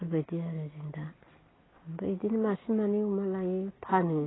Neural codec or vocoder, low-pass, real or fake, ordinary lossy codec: none; 7.2 kHz; real; AAC, 16 kbps